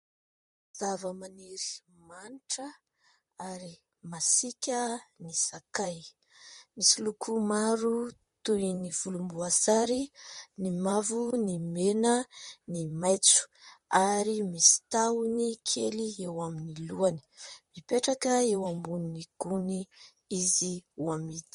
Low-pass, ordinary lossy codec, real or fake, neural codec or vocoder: 19.8 kHz; MP3, 48 kbps; fake; vocoder, 44.1 kHz, 128 mel bands, Pupu-Vocoder